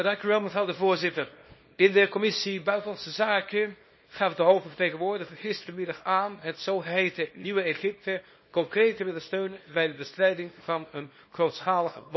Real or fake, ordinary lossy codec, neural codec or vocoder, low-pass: fake; MP3, 24 kbps; codec, 24 kHz, 0.9 kbps, WavTokenizer, small release; 7.2 kHz